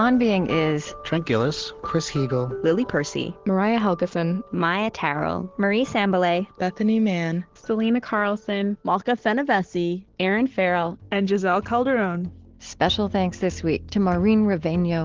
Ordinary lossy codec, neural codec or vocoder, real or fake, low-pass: Opus, 16 kbps; none; real; 7.2 kHz